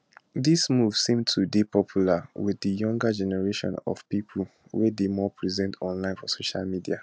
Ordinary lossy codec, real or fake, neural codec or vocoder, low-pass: none; real; none; none